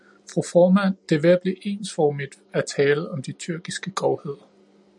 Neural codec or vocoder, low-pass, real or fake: none; 10.8 kHz; real